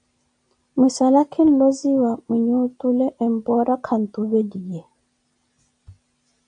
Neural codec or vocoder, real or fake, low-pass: none; real; 9.9 kHz